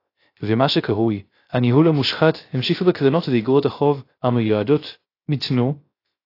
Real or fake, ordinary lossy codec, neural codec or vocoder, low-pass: fake; AAC, 32 kbps; codec, 16 kHz, 0.3 kbps, FocalCodec; 5.4 kHz